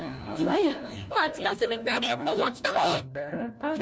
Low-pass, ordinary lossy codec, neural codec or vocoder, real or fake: none; none; codec, 16 kHz, 1 kbps, FunCodec, trained on LibriTTS, 50 frames a second; fake